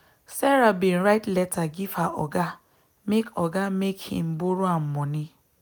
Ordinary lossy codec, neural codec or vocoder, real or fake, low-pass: none; none; real; none